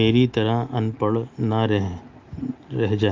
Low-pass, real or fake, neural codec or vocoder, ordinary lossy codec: 7.2 kHz; real; none; Opus, 32 kbps